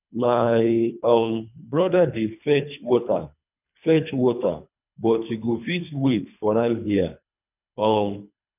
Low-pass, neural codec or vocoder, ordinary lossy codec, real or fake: 3.6 kHz; codec, 24 kHz, 3 kbps, HILCodec; none; fake